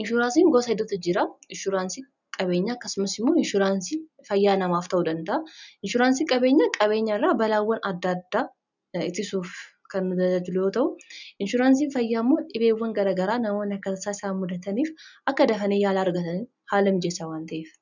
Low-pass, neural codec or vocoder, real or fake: 7.2 kHz; none; real